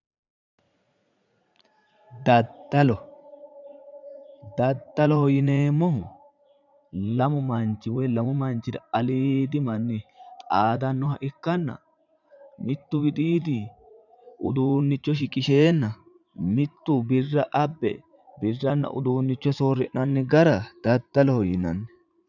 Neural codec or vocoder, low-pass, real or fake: vocoder, 44.1 kHz, 80 mel bands, Vocos; 7.2 kHz; fake